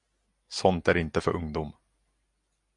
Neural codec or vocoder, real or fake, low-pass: none; real; 10.8 kHz